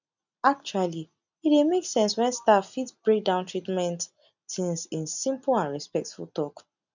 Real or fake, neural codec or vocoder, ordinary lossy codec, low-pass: real; none; none; 7.2 kHz